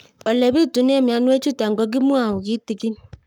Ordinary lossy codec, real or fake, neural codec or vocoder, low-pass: none; fake; codec, 44.1 kHz, 7.8 kbps, Pupu-Codec; 19.8 kHz